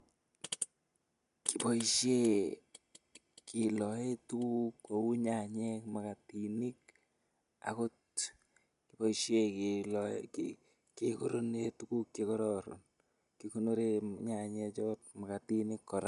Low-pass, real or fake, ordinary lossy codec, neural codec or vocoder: 10.8 kHz; real; none; none